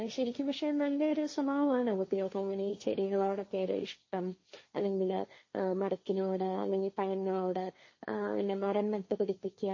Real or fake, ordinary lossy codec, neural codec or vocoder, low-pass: fake; MP3, 32 kbps; codec, 16 kHz, 1.1 kbps, Voila-Tokenizer; 7.2 kHz